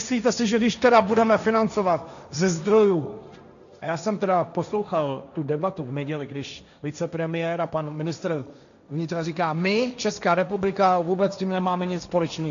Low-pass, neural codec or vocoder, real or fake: 7.2 kHz; codec, 16 kHz, 1.1 kbps, Voila-Tokenizer; fake